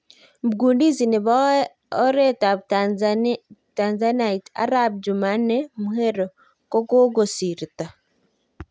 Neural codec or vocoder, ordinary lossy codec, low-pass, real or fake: none; none; none; real